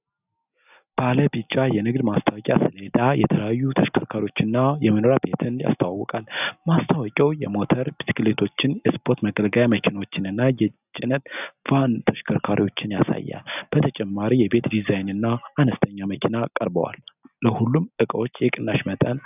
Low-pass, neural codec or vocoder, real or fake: 3.6 kHz; none; real